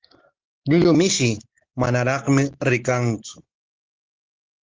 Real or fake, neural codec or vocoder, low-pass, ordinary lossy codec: real; none; 7.2 kHz; Opus, 16 kbps